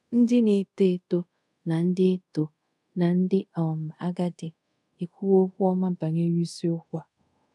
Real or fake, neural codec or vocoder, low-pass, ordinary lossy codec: fake; codec, 24 kHz, 0.5 kbps, DualCodec; none; none